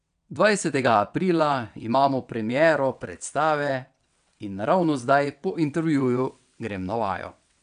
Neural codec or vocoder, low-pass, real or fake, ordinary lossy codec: vocoder, 22.05 kHz, 80 mel bands, WaveNeXt; 9.9 kHz; fake; none